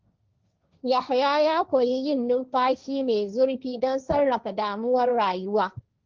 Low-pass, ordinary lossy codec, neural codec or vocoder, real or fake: 7.2 kHz; Opus, 32 kbps; codec, 16 kHz, 1.1 kbps, Voila-Tokenizer; fake